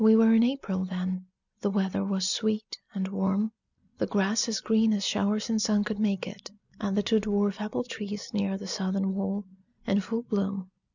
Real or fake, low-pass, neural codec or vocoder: real; 7.2 kHz; none